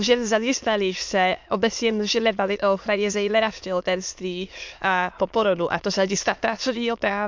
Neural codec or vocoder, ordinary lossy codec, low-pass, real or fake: autoencoder, 22.05 kHz, a latent of 192 numbers a frame, VITS, trained on many speakers; MP3, 64 kbps; 7.2 kHz; fake